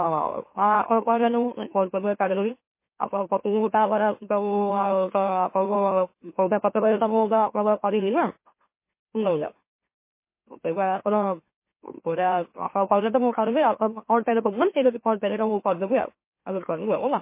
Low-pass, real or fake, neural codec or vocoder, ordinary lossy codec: 3.6 kHz; fake; autoencoder, 44.1 kHz, a latent of 192 numbers a frame, MeloTTS; MP3, 24 kbps